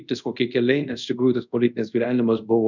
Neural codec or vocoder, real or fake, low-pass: codec, 24 kHz, 0.5 kbps, DualCodec; fake; 7.2 kHz